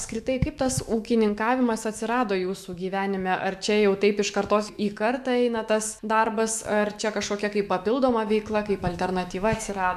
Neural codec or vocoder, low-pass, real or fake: autoencoder, 48 kHz, 128 numbers a frame, DAC-VAE, trained on Japanese speech; 14.4 kHz; fake